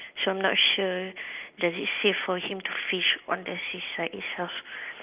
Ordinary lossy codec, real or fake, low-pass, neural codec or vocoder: Opus, 24 kbps; real; 3.6 kHz; none